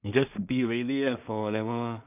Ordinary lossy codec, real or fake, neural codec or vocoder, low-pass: none; fake; codec, 16 kHz in and 24 kHz out, 0.4 kbps, LongCat-Audio-Codec, two codebook decoder; 3.6 kHz